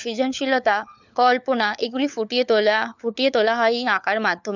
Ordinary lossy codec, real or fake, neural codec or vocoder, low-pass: none; fake; codec, 16 kHz, 4 kbps, FunCodec, trained on LibriTTS, 50 frames a second; 7.2 kHz